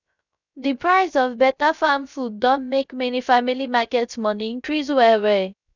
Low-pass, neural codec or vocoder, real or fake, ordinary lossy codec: 7.2 kHz; codec, 16 kHz, 0.3 kbps, FocalCodec; fake; none